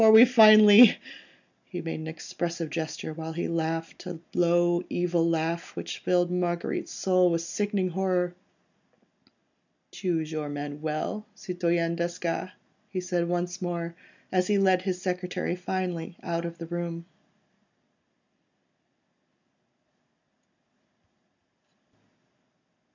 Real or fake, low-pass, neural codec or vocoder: real; 7.2 kHz; none